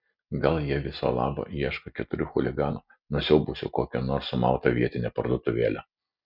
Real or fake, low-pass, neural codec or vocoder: real; 5.4 kHz; none